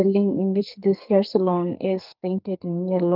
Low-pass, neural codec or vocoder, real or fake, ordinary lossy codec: 5.4 kHz; codec, 44.1 kHz, 2.6 kbps, SNAC; fake; Opus, 32 kbps